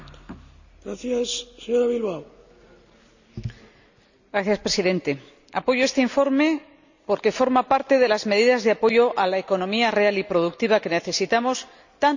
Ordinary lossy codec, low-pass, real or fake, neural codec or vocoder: none; 7.2 kHz; real; none